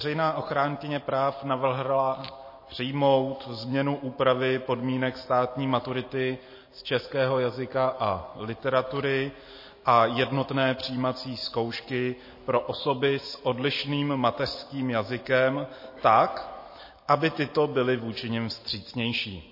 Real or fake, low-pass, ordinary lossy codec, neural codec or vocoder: real; 5.4 kHz; MP3, 24 kbps; none